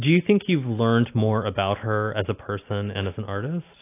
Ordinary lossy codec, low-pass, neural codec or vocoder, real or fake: AAC, 24 kbps; 3.6 kHz; none; real